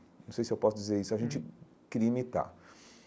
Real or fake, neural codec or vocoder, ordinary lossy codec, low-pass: real; none; none; none